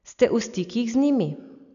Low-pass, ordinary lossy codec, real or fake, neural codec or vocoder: 7.2 kHz; none; real; none